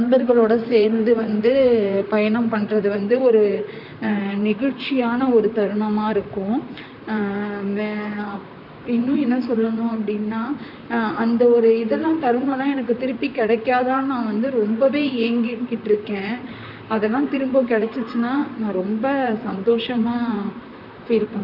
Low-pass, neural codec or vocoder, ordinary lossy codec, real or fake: 5.4 kHz; vocoder, 44.1 kHz, 128 mel bands, Pupu-Vocoder; none; fake